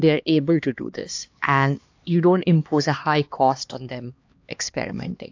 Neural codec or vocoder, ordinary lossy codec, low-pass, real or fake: codec, 16 kHz, 2 kbps, X-Codec, HuBERT features, trained on LibriSpeech; AAC, 48 kbps; 7.2 kHz; fake